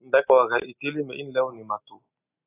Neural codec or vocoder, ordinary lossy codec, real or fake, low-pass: none; AAC, 16 kbps; real; 3.6 kHz